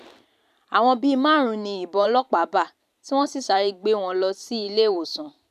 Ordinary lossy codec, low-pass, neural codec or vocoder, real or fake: none; 14.4 kHz; vocoder, 44.1 kHz, 128 mel bands every 512 samples, BigVGAN v2; fake